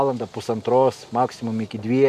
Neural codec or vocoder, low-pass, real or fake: none; 14.4 kHz; real